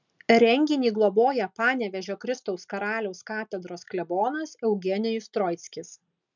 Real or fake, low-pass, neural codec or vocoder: real; 7.2 kHz; none